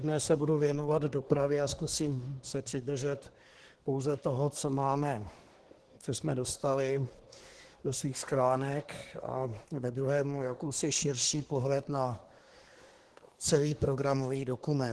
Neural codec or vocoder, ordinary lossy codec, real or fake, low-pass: codec, 24 kHz, 1 kbps, SNAC; Opus, 16 kbps; fake; 10.8 kHz